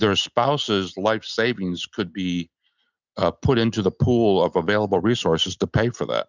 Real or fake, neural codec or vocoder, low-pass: real; none; 7.2 kHz